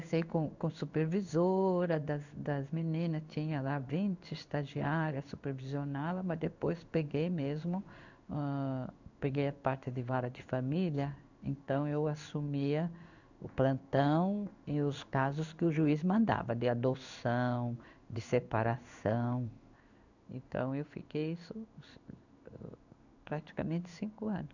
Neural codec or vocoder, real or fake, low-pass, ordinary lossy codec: codec, 16 kHz in and 24 kHz out, 1 kbps, XY-Tokenizer; fake; 7.2 kHz; none